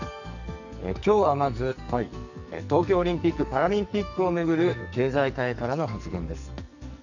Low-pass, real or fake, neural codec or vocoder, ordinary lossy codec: 7.2 kHz; fake; codec, 44.1 kHz, 2.6 kbps, SNAC; none